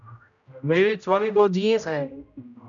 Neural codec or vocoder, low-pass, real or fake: codec, 16 kHz, 0.5 kbps, X-Codec, HuBERT features, trained on general audio; 7.2 kHz; fake